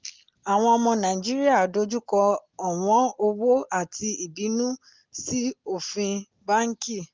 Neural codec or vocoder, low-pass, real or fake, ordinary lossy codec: none; 7.2 kHz; real; Opus, 32 kbps